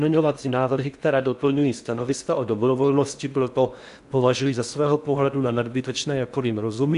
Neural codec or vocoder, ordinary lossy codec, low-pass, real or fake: codec, 16 kHz in and 24 kHz out, 0.6 kbps, FocalCodec, streaming, 4096 codes; AAC, 96 kbps; 10.8 kHz; fake